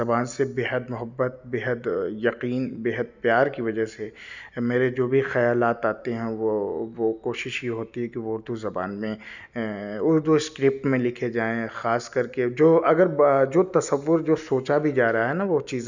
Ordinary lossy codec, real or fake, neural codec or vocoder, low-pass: none; real; none; 7.2 kHz